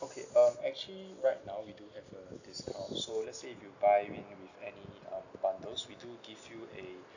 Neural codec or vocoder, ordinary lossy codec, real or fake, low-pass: none; none; real; 7.2 kHz